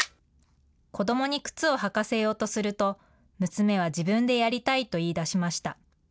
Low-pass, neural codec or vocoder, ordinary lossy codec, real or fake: none; none; none; real